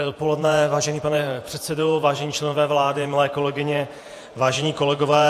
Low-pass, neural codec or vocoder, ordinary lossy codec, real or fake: 14.4 kHz; vocoder, 48 kHz, 128 mel bands, Vocos; AAC, 64 kbps; fake